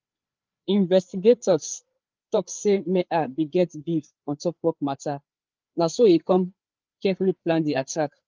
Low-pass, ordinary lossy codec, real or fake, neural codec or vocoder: 7.2 kHz; Opus, 24 kbps; fake; codec, 16 kHz, 4 kbps, FreqCodec, larger model